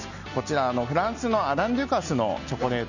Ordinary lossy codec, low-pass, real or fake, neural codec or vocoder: none; 7.2 kHz; real; none